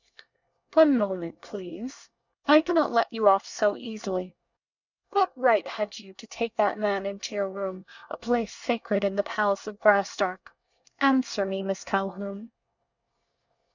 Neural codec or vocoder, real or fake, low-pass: codec, 24 kHz, 1 kbps, SNAC; fake; 7.2 kHz